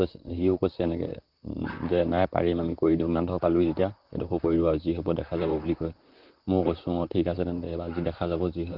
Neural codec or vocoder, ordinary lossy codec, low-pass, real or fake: vocoder, 44.1 kHz, 128 mel bands, Pupu-Vocoder; Opus, 32 kbps; 5.4 kHz; fake